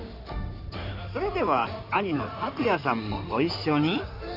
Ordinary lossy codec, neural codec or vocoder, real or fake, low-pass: none; codec, 16 kHz in and 24 kHz out, 2.2 kbps, FireRedTTS-2 codec; fake; 5.4 kHz